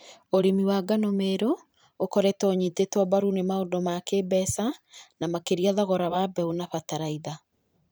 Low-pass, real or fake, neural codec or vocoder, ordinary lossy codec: none; fake; vocoder, 44.1 kHz, 128 mel bands every 512 samples, BigVGAN v2; none